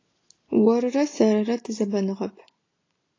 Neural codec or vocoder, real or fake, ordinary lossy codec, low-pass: none; real; AAC, 32 kbps; 7.2 kHz